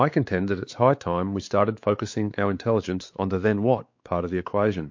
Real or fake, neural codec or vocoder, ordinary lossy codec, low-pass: fake; codec, 16 kHz, 4 kbps, X-Codec, WavLM features, trained on Multilingual LibriSpeech; MP3, 48 kbps; 7.2 kHz